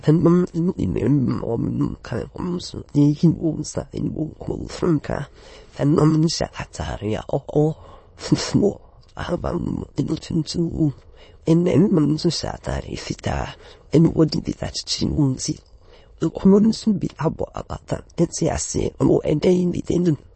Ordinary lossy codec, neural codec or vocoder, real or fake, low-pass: MP3, 32 kbps; autoencoder, 22.05 kHz, a latent of 192 numbers a frame, VITS, trained on many speakers; fake; 9.9 kHz